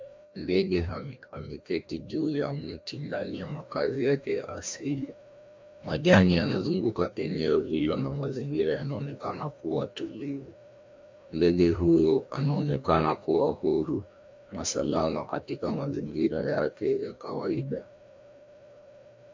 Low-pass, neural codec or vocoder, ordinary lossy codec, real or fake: 7.2 kHz; codec, 16 kHz, 1 kbps, FreqCodec, larger model; MP3, 64 kbps; fake